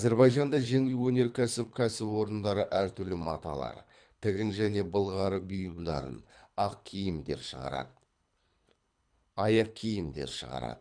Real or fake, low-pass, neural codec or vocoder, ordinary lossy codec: fake; 9.9 kHz; codec, 24 kHz, 3 kbps, HILCodec; none